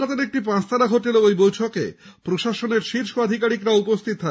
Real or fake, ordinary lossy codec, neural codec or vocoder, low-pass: real; none; none; none